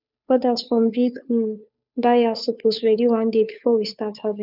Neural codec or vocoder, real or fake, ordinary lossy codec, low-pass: codec, 16 kHz, 2 kbps, FunCodec, trained on Chinese and English, 25 frames a second; fake; none; 5.4 kHz